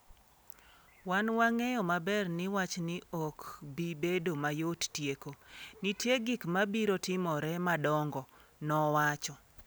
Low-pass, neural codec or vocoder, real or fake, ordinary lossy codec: none; none; real; none